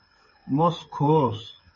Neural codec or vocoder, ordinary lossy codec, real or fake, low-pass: codec, 16 kHz, 8 kbps, FreqCodec, smaller model; MP3, 32 kbps; fake; 7.2 kHz